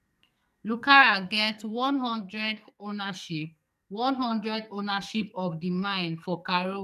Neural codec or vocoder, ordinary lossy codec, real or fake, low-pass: codec, 44.1 kHz, 2.6 kbps, SNAC; none; fake; 14.4 kHz